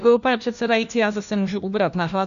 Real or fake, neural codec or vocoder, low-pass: fake; codec, 16 kHz, 1 kbps, FunCodec, trained on LibriTTS, 50 frames a second; 7.2 kHz